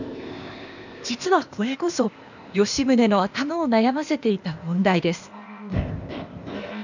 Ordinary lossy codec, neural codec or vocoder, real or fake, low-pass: none; codec, 16 kHz, 0.8 kbps, ZipCodec; fake; 7.2 kHz